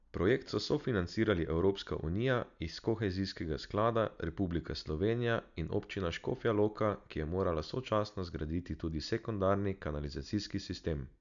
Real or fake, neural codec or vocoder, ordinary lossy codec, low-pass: real; none; none; 7.2 kHz